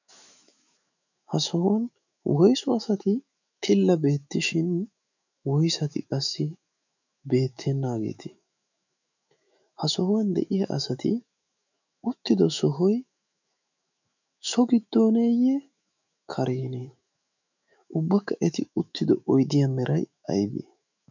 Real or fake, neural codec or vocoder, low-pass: fake; autoencoder, 48 kHz, 128 numbers a frame, DAC-VAE, trained on Japanese speech; 7.2 kHz